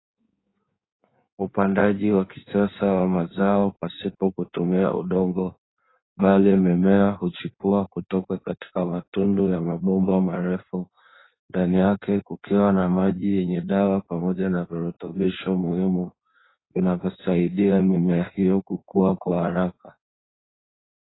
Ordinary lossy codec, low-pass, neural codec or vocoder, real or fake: AAC, 16 kbps; 7.2 kHz; codec, 16 kHz in and 24 kHz out, 1.1 kbps, FireRedTTS-2 codec; fake